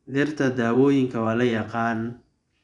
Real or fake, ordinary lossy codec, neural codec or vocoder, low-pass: fake; none; vocoder, 24 kHz, 100 mel bands, Vocos; 10.8 kHz